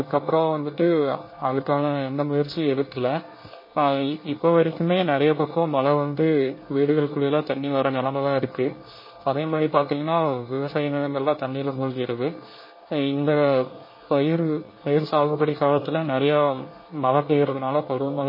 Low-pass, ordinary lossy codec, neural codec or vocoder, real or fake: 5.4 kHz; MP3, 24 kbps; codec, 24 kHz, 1 kbps, SNAC; fake